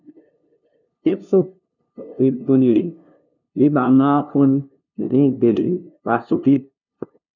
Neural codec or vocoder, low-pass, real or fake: codec, 16 kHz, 0.5 kbps, FunCodec, trained on LibriTTS, 25 frames a second; 7.2 kHz; fake